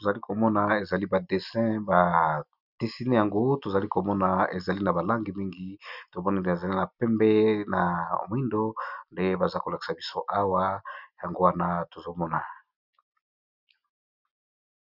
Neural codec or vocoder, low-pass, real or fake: none; 5.4 kHz; real